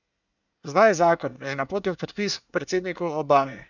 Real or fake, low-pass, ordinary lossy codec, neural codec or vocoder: fake; 7.2 kHz; none; codec, 24 kHz, 1 kbps, SNAC